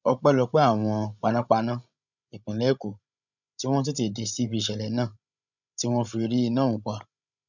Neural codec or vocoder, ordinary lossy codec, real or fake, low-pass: codec, 16 kHz, 16 kbps, FreqCodec, larger model; none; fake; 7.2 kHz